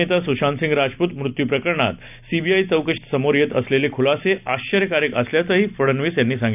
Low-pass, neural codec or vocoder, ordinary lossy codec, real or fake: 3.6 kHz; none; none; real